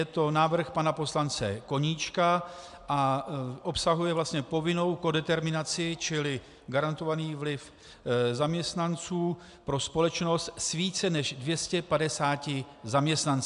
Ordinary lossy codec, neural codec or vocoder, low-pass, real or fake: AAC, 96 kbps; none; 10.8 kHz; real